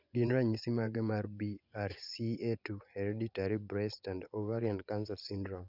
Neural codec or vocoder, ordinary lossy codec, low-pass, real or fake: vocoder, 24 kHz, 100 mel bands, Vocos; none; 5.4 kHz; fake